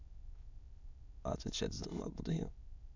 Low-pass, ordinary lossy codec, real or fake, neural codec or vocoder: 7.2 kHz; none; fake; autoencoder, 22.05 kHz, a latent of 192 numbers a frame, VITS, trained on many speakers